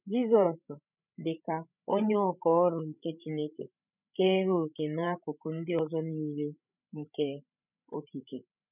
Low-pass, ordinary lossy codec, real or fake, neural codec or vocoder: 3.6 kHz; none; fake; codec, 16 kHz, 16 kbps, FreqCodec, larger model